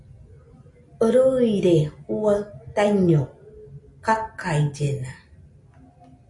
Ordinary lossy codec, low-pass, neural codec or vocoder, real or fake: AAC, 48 kbps; 10.8 kHz; none; real